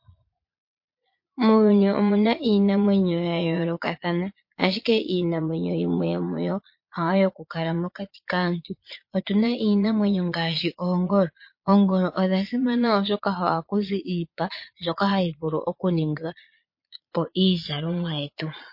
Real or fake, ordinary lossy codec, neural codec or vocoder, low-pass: fake; MP3, 32 kbps; vocoder, 22.05 kHz, 80 mel bands, Vocos; 5.4 kHz